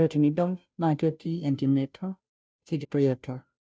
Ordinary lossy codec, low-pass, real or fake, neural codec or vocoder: none; none; fake; codec, 16 kHz, 0.5 kbps, FunCodec, trained on Chinese and English, 25 frames a second